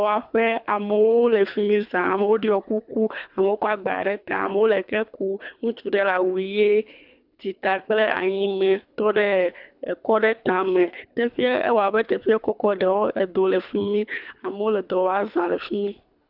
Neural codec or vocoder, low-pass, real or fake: codec, 24 kHz, 3 kbps, HILCodec; 5.4 kHz; fake